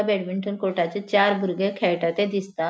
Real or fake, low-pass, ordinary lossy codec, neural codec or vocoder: real; none; none; none